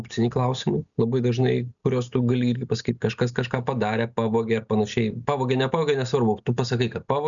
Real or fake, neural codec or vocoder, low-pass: real; none; 7.2 kHz